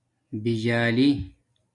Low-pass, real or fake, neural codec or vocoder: 10.8 kHz; real; none